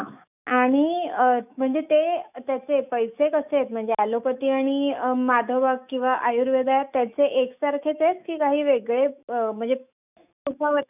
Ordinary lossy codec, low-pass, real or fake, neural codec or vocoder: none; 3.6 kHz; fake; autoencoder, 48 kHz, 128 numbers a frame, DAC-VAE, trained on Japanese speech